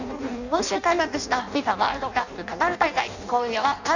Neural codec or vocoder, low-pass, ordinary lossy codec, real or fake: codec, 16 kHz in and 24 kHz out, 0.6 kbps, FireRedTTS-2 codec; 7.2 kHz; none; fake